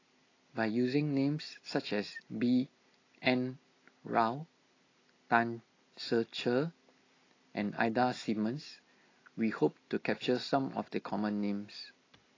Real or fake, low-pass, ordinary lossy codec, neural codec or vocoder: real; 7.2 kHz; AAC, 32 kbps; none